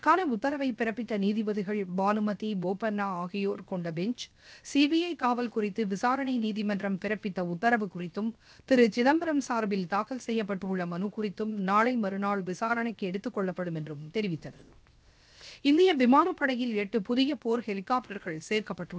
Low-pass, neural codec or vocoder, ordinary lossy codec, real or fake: none; codec, 16 kHz, 0.7 kbps, FocalCodec; none; fake